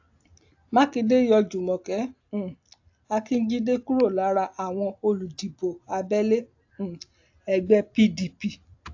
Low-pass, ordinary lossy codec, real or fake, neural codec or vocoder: 7.2 kHz; AAC, 48 kbps; real; none